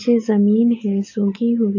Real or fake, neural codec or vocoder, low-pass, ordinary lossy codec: real; none; 7.2 kHz; AAC, 48 kbps